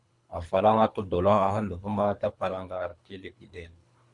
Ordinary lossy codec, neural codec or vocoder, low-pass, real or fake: MP3, 96 kbps; codec, 24 kHz, 3 kbps, HILCodec; 10.8 kHz; fake